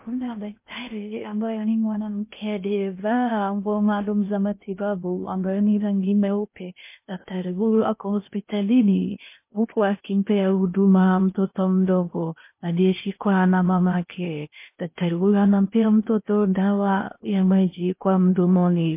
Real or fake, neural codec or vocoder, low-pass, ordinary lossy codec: fake; codec, 16 kHz in and 24 kHz out, 0.6 kbps, FocalCodec, streaming, 2048 codes; 3.6 kHz; MP3, 24 kbps